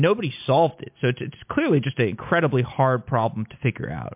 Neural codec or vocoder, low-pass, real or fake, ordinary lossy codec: none; 3.6 kHz; real; MP3, 32 kbps